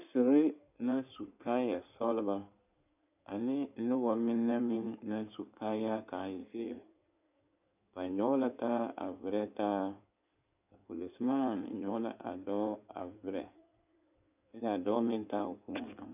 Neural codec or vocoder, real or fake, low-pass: codec, 16 kHz in and 24 kHz out, 2.2 kbps, FireRedTTS-2 codec; fake; 3.6 kHz